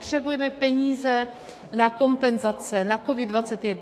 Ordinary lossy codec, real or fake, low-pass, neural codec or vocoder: AAC, 64 kbps; fake; 14.4 kHz; codec, 32 kHz, 1.9 kbps, SNAC